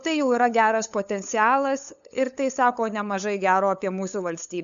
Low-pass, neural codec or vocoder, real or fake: 7.2 kHz; codec, 16 kHz, 8 kbps, FunCodec, trained on LibriTTS, 25 frames a second; fake